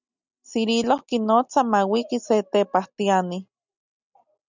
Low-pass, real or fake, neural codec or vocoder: 7.2 kHz; real; none